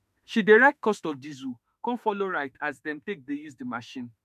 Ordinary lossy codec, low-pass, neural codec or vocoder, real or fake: none; 14.4 kHz; autoencoder, 48 kHz, 32 numbers a frame, DAC-VAE, trained on Japanese speech; fake